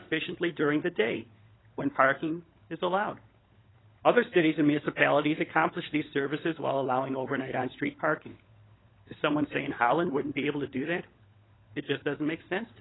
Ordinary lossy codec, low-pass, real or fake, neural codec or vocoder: AAC, 16 kbps; 7.2 kHz; fake; codec, 16 kHz, 16 kbps, FunCodec, trained on LibriTTS, 50 frames a second